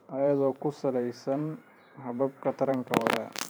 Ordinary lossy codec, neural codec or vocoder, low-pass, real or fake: none; vocoder, 44.1 kHz, 128 mel bands every 512 samples, BigVGAN v2; none; fake